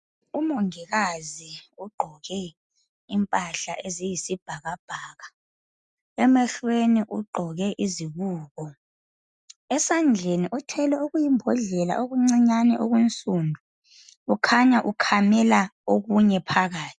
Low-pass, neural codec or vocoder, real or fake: 10.8 kHz; none; real